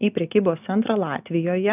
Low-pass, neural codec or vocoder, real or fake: 3.6 kHz; none; real